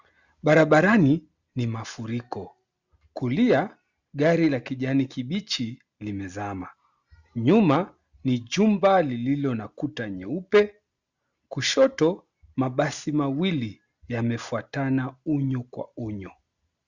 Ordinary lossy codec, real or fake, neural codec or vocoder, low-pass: Opus, 64 kbps; real; none; 7.2 kHz